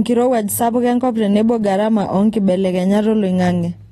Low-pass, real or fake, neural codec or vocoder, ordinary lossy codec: 19.8 kHz; real; none; AAC, 32 kbps